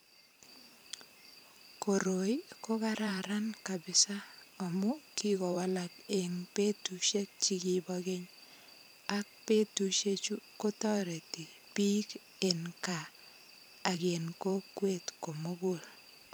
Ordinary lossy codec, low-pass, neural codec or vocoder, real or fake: none; none; vocoder, 44.1 kHz, 128 mel bands every 512 samples, BigVGAN v2; fake